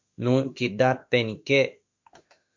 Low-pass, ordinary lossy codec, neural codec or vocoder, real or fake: 7.2 kHz; MP3, 48 kbps; autoencoder, 48 kHz, 32 numbers a frame, DAC-VAE, trained on Japanese speech; fake